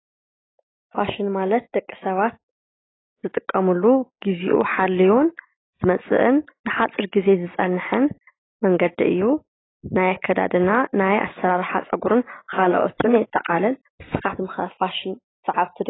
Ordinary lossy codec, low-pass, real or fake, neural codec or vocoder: AAC, 16 kbps; 7.2 kHz; real; none